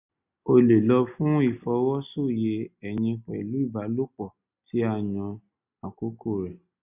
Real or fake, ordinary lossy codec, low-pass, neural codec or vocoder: real; none; 3.6 kHz; none